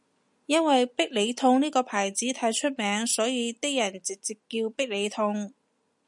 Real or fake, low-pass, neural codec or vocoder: real; 10.8 kHz; none